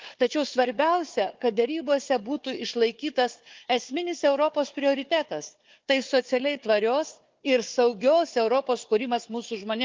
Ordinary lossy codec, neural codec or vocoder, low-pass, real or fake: Opus, 32 kbps; codec, 16 kHz, 4 kbps, FunCodec, trained on Chinese and English, 50 frames a second; 7.2 kHz; fake